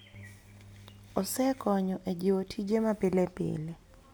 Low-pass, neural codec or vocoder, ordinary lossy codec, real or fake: none; none; none; real